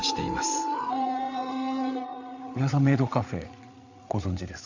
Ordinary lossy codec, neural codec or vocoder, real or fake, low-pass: AAC, 48 kbps; codec, 16 kHz, 8 kbps, FreqCodec, larger model; fake; 7.2 kHz